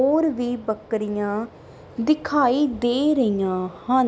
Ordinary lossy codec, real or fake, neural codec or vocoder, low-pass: none; real; none; none